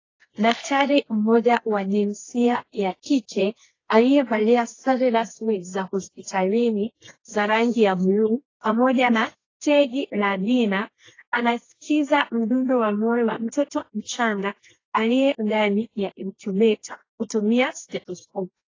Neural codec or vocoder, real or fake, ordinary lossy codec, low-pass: codec, 24 kHz, 0.9 kbps, WavTokenizer, medium music audio release; fake; AAC, 32 kbps; 7.2 kHz